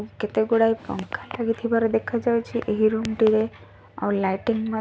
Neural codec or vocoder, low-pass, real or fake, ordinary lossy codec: none; none; real; none